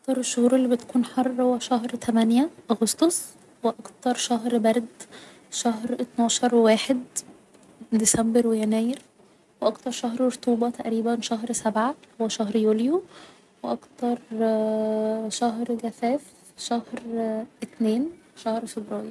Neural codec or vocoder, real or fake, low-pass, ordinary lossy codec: none; real; none; none